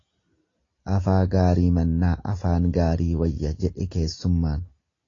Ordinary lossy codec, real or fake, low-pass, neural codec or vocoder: AAC, 32 kbps; real; 7.2 kHz; none